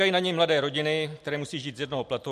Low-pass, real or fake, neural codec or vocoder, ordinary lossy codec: 14.4 kHz; real; none; MP3, 64 kbps